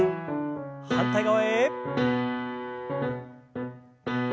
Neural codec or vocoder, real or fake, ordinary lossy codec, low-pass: none; real; none; none